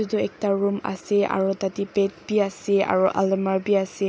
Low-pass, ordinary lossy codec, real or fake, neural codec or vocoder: none; none; real; none